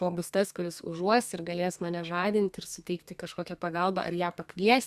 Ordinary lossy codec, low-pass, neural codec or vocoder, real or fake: Opus, 64 kbps; 14.4 kHz; codec, 32 kHz, 1.9 kbps, SNAC; fake